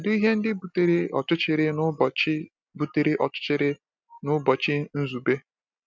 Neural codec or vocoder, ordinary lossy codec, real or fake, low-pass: none; none; real; none